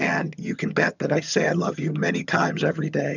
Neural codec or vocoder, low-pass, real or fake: vocoder, 22.05 kHz, 80 mel bands, HiFi-GAN; 7.2 kHz; fake